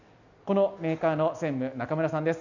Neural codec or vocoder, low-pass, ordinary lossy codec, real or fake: none; 7.2 kHz; none; real